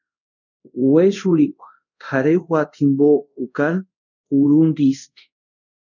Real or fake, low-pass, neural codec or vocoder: fake; 7.2 kHz; codec, 24 kHz, 0.5 kbps, DualCodec